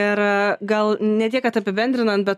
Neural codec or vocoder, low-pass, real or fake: none; 14.4 kHz; real